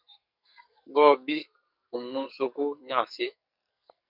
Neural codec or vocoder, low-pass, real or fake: codec, 44.1 kHz, 2.6 kbps, SNAC; 5.4 kHz; fake